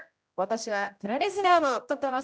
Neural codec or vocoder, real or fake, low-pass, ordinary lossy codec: codec, 16 kHz, 0.5 kbps, X-Codec, HuBERT features, trained on general audio; fake; none; none